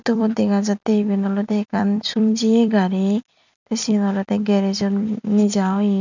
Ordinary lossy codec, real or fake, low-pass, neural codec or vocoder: none; fake; 7.2 kHz; vocoder, 44.1 kHz, 128 mel bands every 256 samples, BigVGAN v2